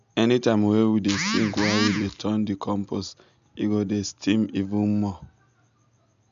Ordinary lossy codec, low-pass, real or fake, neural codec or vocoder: MP3, 64 kbps; 7.2 kHz; real; none